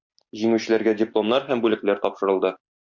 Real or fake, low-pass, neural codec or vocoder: real; 7.2 kHz; none